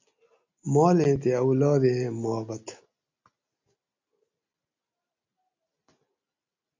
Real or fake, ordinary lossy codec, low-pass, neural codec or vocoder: real; MP3, 64 kbps; 7.2 kHz; none